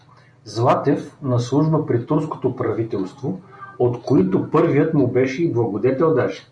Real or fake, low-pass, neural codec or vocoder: real; 9.9 kHz; none